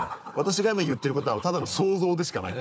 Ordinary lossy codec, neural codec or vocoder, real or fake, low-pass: none; codec, 16 kHz, 4 kbps, FunCodec, trained on Chinese and English, 50 frames a second; fake; none